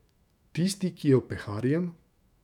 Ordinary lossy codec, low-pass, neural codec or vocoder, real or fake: none; 19.8 kHz; autoencoder, 48 kHz, 128 numbers a frame, DAC-VAE, trained on Japanese speech; fake